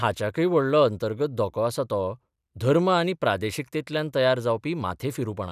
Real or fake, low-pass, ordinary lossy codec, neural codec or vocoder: real; 14.4 kHz; none; none